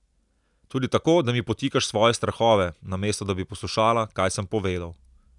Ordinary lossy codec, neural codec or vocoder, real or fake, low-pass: none; none; real; 10.8 kHz